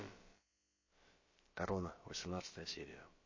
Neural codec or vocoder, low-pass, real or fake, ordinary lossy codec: codec, 16 kHz, about 1 kbps, DyCAST, with the encoder's durations; 7.2 kHz; fake; MP3, 32 kbps